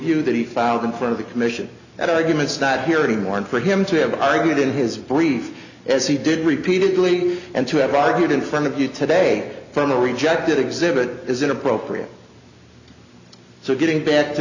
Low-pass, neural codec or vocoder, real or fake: 7.2 kHz; none; real